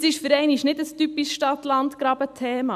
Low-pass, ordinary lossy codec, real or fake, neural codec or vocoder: 14.4 kHz; none; real; none